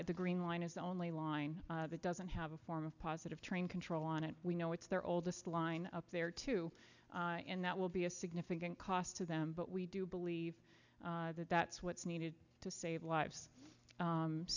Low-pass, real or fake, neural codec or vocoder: 7.2 kHz; real; none